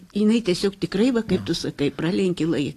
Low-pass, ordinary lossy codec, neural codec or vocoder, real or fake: 14.4 kHz; AAC, 48 kbps; none; real